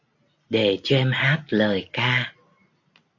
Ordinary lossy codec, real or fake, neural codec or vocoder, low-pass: AAC, 48 kbps; real; none; 7.2 kHz